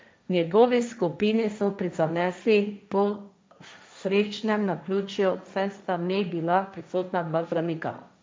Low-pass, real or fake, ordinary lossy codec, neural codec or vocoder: none; fake; none; codec, 16 kHz, 1.1 kbps, Voila-Tokenizer